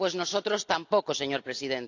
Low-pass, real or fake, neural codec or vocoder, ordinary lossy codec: 7.2 kHz; real; none; AAC, 48 kbps